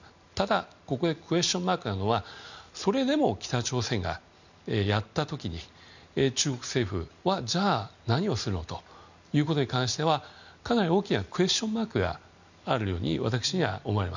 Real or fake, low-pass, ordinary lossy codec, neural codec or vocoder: real; 7.2 kHz; none; none